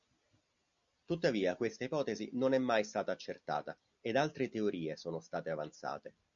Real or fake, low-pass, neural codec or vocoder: real; 7.2 kHz; none